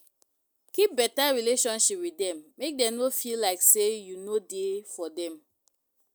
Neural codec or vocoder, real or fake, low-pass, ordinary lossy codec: none; real; none; none